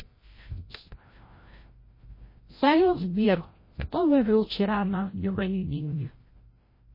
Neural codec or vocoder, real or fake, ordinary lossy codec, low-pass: codec, 16 kHz, 0.5 kbps, FreqCodec, larger model; fake; MP3, 24 kbps; 5.4 kHz